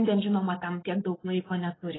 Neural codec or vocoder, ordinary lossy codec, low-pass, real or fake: none; AAC, 16 kbps; 7.2 kHz; real